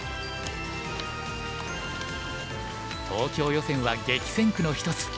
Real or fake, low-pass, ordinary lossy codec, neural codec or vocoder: real; none; none; none